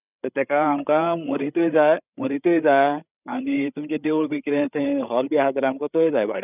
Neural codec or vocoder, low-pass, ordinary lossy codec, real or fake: codec, 16 kHz, 8 kbps, FreqCodec, larger model; 3.6 kHz; none; fake